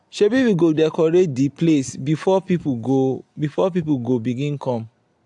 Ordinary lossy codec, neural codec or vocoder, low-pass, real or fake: AAC, 64 kbps; none; 10.8 kHz; real